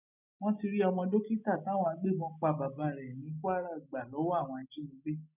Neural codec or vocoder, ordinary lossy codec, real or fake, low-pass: none; none; real; 3.6 kHz